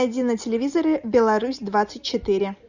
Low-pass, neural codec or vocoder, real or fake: 7.2 kHz; none; real